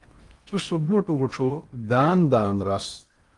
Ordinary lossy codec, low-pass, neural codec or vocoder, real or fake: Opus, 32 kbps; 10.8 kHz; codec, 16 kHz in and 24 kHz out, 0.6 kbps, FocalCodec, streaming, 4096 codes; fake